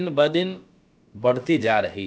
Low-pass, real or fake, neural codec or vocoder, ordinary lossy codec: none; fake; codec, 16 kHz, about 1 kbps, DyCAST, with the encoder's durations; none